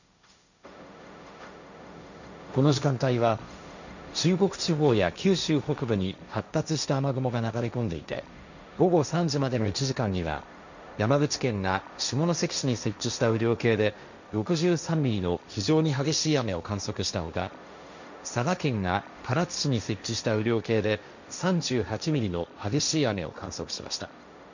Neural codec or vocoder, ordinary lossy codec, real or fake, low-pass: codec, 16 kHz, 1.1 kbps, Voila-Tokenizer; none; fake; 7.2 kHz